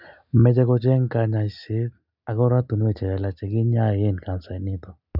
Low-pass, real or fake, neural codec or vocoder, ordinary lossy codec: 5.4 kHz; real; none; none